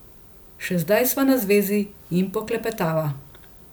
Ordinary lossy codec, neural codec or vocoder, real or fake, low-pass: none; none; real; none